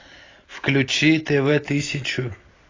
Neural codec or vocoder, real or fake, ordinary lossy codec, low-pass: none; real; AAC, 32 kbps; 7.2 kHz